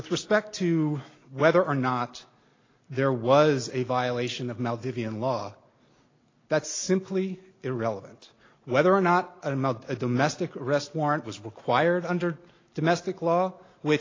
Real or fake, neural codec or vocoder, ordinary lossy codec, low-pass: real; none; AAC, 32 kbps; 7.2 kHz